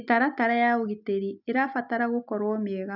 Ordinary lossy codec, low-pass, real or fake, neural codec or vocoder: none; 5.4 kHz; real; none